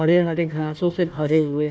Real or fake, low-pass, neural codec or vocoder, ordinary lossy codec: fake; none; codec, 16 kHz, 1 kbps, FunCodec, trained on Chinese and English, 50 frames a second; none